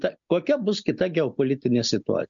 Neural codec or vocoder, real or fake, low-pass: none; real; 7.2 kHz